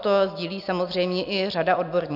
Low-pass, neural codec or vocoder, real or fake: 5.4 kHz; none; real